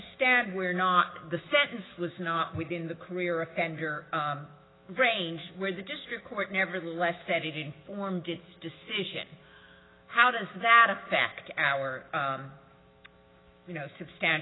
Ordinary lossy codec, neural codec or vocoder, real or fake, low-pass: AAC, 16 kbps; autoencoder, 48 kHz, 128 numbers a frame, DAC-VAE, trained on Japanese speech; fake; 7.2 kHz